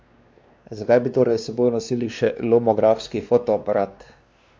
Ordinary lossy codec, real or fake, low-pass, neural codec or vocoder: none; fake; none; codec, 16 kHz, 2 kbps, X-Codec, WavLM features, trained on Multilingual LibriSpeech